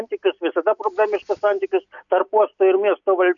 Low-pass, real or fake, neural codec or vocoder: 7.2 kHz; real; none